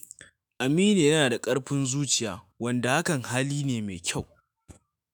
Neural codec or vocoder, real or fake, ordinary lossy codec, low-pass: autoencoder, 48 kHz, 128 numbers a frame, DAC-VAE, trained on Japanese speech; fake; none; none